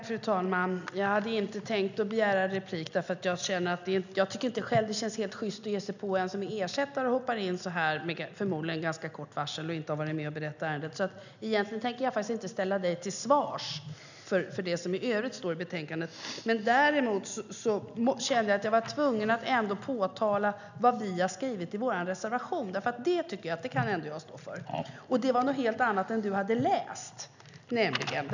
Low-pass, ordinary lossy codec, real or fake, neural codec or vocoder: 7.2 kHz; none; real; none